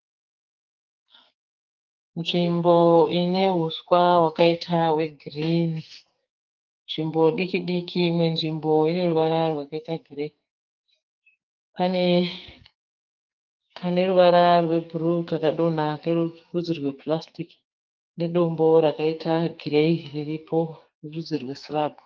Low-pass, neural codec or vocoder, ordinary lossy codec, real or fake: 7.2 kHz; codec, 44.1 kHz, 2.6 kbps, SNAC; Opus, 32 kbps; fake